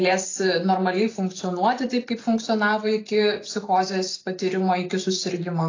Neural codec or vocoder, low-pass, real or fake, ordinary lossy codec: none; 7.2 kHz; real; AAC, 32 kbps